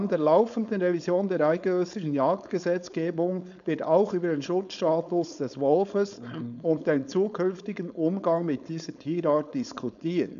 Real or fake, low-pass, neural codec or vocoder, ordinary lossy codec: fake; 7.2 kHz; codec, 16 kHz, 4.8 kbps, FACodec; none